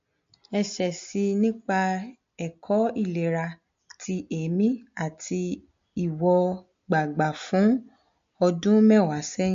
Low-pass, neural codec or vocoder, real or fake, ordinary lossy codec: 7.2 kHz; none; real; MP3, 48 kbps